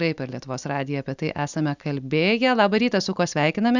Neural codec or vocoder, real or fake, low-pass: none; real; 7.2 kHz